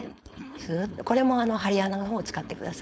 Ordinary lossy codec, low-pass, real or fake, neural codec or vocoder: none; none; fake; codec, 16 kHz, 4.8 kbps, FACodec